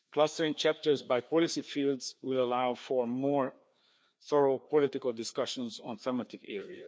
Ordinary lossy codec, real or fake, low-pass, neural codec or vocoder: none; fake; none; codec, 16 kHz, 2 kbps, FreqCodec, larger model